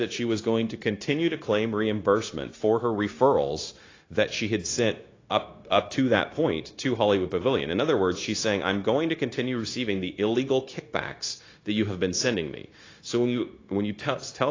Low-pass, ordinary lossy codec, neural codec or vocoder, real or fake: 7.2 kHz; AAC, 32 kbps; codec, 16 kHz, 0.9 kbps, LongCat-Audio-Codec; fake